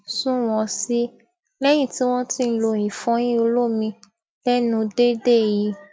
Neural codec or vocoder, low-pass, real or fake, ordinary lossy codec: none; none; real; none